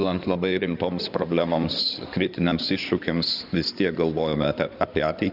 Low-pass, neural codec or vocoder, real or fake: 5.4 kHz; codec, 16 kHz in and 24 kHz out, 2.2 kbps, FireRedTTS-2 codec; fake